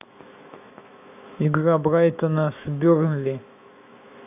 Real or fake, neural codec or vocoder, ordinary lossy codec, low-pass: fake; autoencoder, 48 kHz, 128 numbers a frame, DAC-VAE, trained on Japanese speech; AAC, 32 kbps; 3.6 kHz